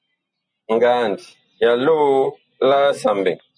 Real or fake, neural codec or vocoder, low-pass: real; none; 9.9 kHz